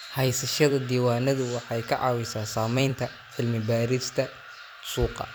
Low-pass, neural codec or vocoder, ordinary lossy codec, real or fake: none; vocoder, 44.1 kHz, 128 mel bands every 256 samples, BigVGAN v2; none; fake